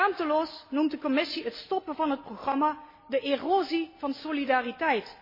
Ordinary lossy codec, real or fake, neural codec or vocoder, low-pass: MP3, 24 kbps; real; none; 5.4 kHz